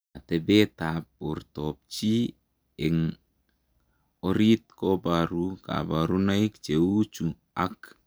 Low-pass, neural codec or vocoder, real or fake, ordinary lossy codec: none; none; real; none